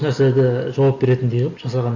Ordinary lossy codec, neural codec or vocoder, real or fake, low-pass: none; none; real; 7.2 kHz